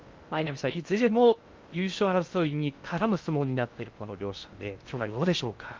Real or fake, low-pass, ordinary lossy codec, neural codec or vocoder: fake; 7.2 kHz; Opus, 24 kbps; codec, 16 kHz in and 24 kHz out, 0.6 kbps, FocalCodec, streaming, 2048 codes